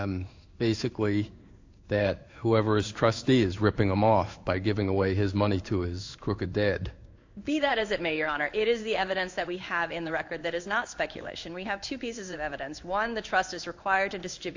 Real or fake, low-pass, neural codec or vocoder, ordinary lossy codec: fake; 7.2 kHz; codec, 16 kHz in and 24 kHz out, 1 kbps, XY-Tokenizer; AAC, 48 kbps